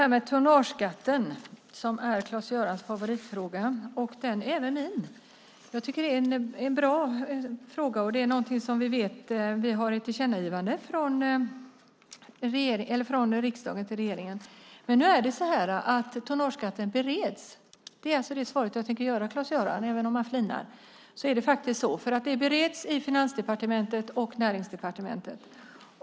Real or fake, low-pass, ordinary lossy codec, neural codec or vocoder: real; none; none; none